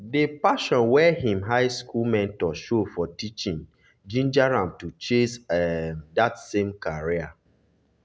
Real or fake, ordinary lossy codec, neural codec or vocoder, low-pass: real; none; none; none